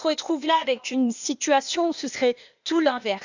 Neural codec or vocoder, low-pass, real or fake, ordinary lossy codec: codec, 16 kHz, 0.8 kbps, ZipCodec; 7.2 kHz; fake; none